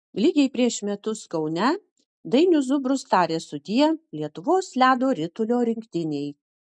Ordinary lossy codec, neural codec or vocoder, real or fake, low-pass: MP3, 96 kbps; none; real; 9.9 kHz